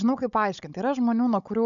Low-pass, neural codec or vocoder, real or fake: 7.2 kHz; codec, 16 kHz, 16 kbps, FunCodec, trained on Chinese and English, 50 frames a second; fake